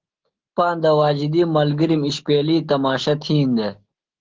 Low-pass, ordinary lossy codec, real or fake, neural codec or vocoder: 7.2 kHz; Opus, 16 kbps; fake; autoencoder, 48 kHz, 128 numbers a frame, DAC-VAE, trained on Japanese speech